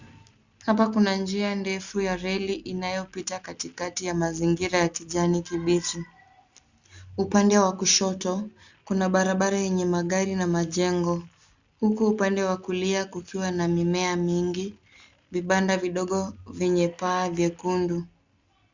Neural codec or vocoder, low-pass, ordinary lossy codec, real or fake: none; 7.2 kHz; Opus, 64 kbps; real